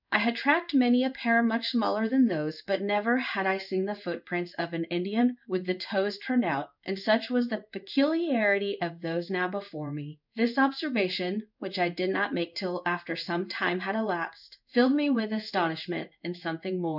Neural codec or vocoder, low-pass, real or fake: codec, 16 kHz in and 24 kHz out, 1 kbps, XY-Tokenizer; 5.4 kHz; fake